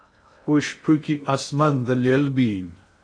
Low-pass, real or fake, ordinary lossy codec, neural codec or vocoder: 9.9 kHz; fake; AAC, 48 kbps; codec, 16 kHz in and 24 kHz out, 0.6 kbps, FocalCodec, streaming, 2048 codes